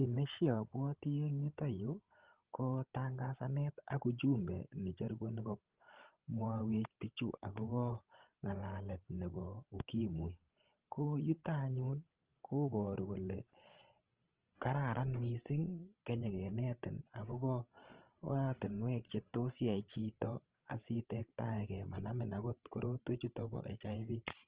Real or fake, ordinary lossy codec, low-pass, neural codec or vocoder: fake; Opus, 16 kbps; 3.6 kHz; codec, 16 kHz, 16 kbps, FreqCodec, larger model